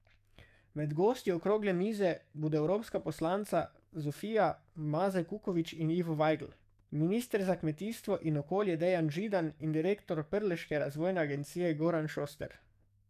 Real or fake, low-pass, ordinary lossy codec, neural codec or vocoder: fake; 14.4 kHz; none; codec, 44.1 kHz, 7.8 kbps, DAC